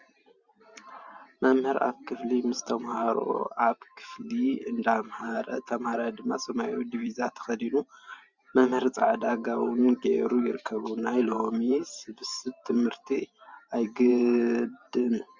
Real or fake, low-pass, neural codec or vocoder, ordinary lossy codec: fake; 7.2 kHz; vocoder, 44.1 kHz, 128 mel bands every 512 samples, BigVGAN v2; Opus, 64 kbps